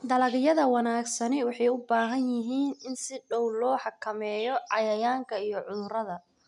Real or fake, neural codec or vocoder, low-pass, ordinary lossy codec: real; none; 10.8 kHz; none